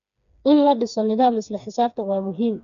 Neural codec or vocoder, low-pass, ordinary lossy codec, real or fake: codec, 16 kHz, 4 kbps, FreqCodec, smaller model; 7.2 kHz; none; fake